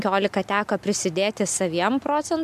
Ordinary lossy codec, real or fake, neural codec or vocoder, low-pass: MP3, 96 kbps; real; none; 14.4 kHz